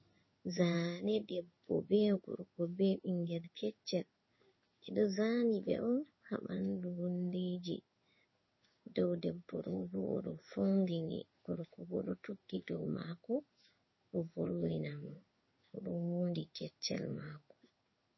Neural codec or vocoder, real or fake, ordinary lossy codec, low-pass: codec, 16 kHz in and 24 kHz out, 1 kbps, XY-Tokenizer; fake; MP3, 24 kbps; 7.2 kHz